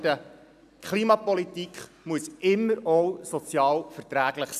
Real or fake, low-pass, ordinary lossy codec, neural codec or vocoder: real; 14.4 kHz; none; none